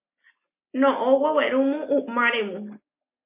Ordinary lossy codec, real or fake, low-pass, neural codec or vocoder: MP3, 24 kbps; real; 3.6 kHz; none